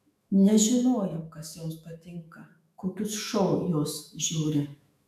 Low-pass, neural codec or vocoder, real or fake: 14.4 kHz; autoencoder, 48 kHz, 128 numbers a frame, DAC-VAE, trained on Japanese speech; fake